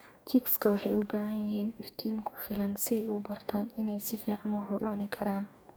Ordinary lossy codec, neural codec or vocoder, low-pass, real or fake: none; codec, 44.1 kHz, 2.6 kbps, DAC; none; fake